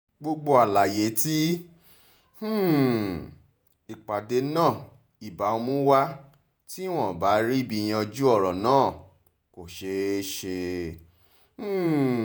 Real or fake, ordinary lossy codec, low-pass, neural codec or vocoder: fake; none; none; vocoder, 48 kHz, 128 mel bands, Vocos